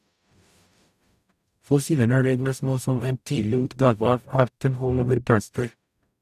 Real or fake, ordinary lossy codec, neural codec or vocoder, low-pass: fake; none; codec, 44.1 kHz, 0.9 kbps, DAC; 14.4 kHz